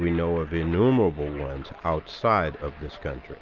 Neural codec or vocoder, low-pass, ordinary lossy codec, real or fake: none; 7.2 kHz; Opus, 24 kbps; real